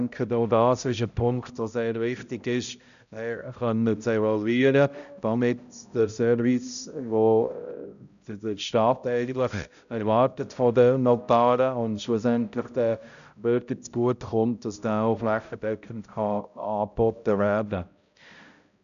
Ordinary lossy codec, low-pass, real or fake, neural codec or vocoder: none; 7.2 kHz; fake; codec, 16 kHz, 0.5 kbps, X-Codec, HuBERT features, trained on balanced general audio